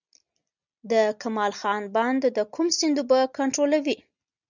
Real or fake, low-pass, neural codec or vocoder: real; 7.2 kHz; none